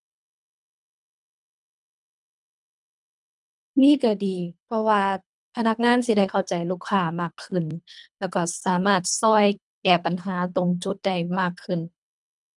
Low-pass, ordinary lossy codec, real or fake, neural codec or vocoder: 10.8 kHz; none; fake; codec, 24 kHz, 3 kbps, HILCodec